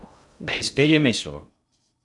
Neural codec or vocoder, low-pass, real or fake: codec, 16 kHz in and 24 kHz out, 0.6 kbps, FocalCodec, streaming, 2048 codes; 10.8 kHz; fake